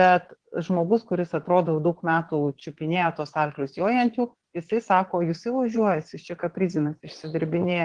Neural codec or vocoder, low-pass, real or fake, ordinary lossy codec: vocoder, 22.05 kHz, 80 mel bands, Vocos; 9.9 kHz; fake; Opus, 16 kbps